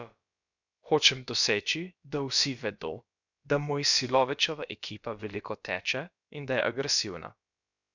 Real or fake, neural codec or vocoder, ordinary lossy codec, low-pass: fake; codec, 16 kHz, about 1 kbps, DyCAST, with the encoder's durations; none; 7.2 kHz